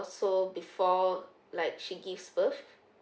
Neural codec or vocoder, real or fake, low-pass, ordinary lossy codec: none; real; none; none